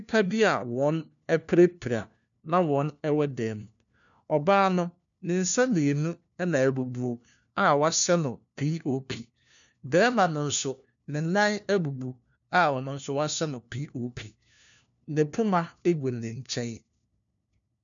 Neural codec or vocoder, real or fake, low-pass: codec, 16 kHz, 1 kbps, FunCodec, trained on LibriTTS, 50 frames a second; fake; 7.2 kHz